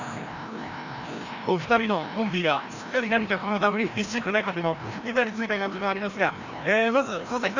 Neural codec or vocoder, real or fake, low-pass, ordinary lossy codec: codec, 16 kHz, 1 kbps, FreqCodec, larger model; fake; 7.2 kHz; none